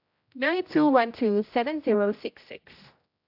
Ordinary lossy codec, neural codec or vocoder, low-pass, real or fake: AAC, 48 kbps; codec, 16 kHz, 0.5 kbps, X-Codec, HuBERT features, trained on general audio; 5.4 kHz; fake